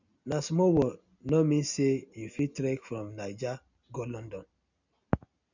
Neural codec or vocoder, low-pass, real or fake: none; 7.2 kHz; real